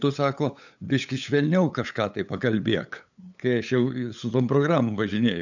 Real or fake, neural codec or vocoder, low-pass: fake; codec, 16 kHz, 8 kbps, FunCodec, trained on LibriTTS, 25 frames a second; 7.2 kHz